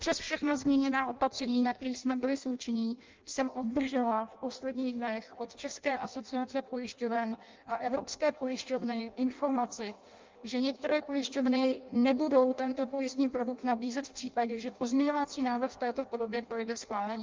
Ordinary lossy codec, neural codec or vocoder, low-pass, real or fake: Opus, 24 kbps; codec, 16 kHz in and 24 kHz out, 0.6 kbps, FireRedTTS-2 codec; 7.2 kHz; fake